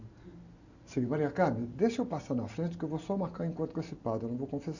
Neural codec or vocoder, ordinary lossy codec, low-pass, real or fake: none; none; 7.2 kHz; real